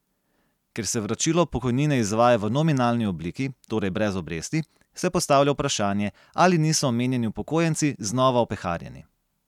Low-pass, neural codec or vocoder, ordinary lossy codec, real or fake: 19.8 kHz; none; none; real